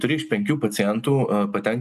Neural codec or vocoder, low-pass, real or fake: none; 14.4 kHz; real